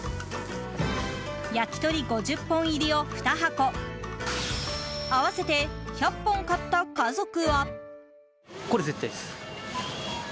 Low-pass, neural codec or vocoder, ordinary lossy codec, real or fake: none; none; none; real